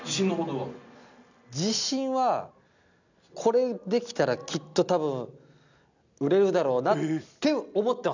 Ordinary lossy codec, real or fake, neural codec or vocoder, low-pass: none; real; none; 7.2 kHz